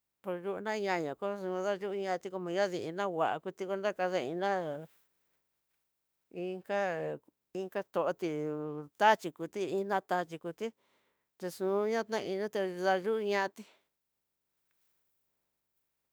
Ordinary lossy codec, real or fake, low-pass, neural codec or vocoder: none; fake; none; autoencoder, 48 kHz, 32 numbers a frame, DAC-VAE, trained on Japanese speech